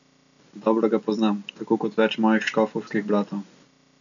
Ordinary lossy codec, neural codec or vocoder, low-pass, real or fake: none; none; 7.2 kHz; real